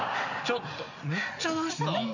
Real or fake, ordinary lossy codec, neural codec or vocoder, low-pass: real; none; none; 7.2 kHz